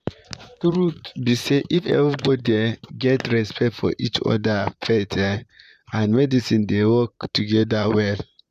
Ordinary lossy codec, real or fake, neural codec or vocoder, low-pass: none; fake; vocoder, 44.1 kHz, 128 mel bands, Pupu-Vocoder; 14.4 kHz